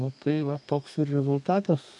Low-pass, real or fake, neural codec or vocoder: 10.8 kHz; fake; codec, 32 kHz, 1.9 kbps, SNAC